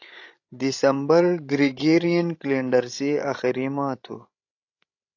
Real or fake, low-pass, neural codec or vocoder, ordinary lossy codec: fake; 7.2 kHz; codec, 16 kHz, 16 kbps, FreqCodec, larger model; AAC, 48 kbps